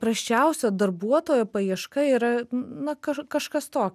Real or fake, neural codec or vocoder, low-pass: real; none; 14.4 kHz